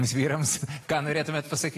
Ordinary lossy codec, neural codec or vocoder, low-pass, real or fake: AAC, 48 kbps; none; 14.4 kHz; real